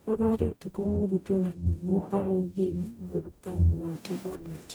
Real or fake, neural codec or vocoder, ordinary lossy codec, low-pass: fake; codec, 44.1 kHz, 0.9 kbps, DAC; none; none